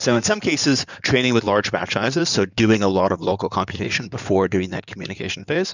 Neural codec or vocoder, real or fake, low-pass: codec, 16 kHz in and 24 kHz out, 2.2 kbps, FireRedTTS-2 codec; fake; 7.2 kHz